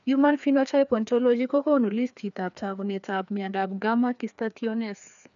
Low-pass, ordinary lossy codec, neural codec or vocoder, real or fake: 7.2 kHz; none; codec, 16 kHz, 2 kbps, FreqCodec, larger model; fake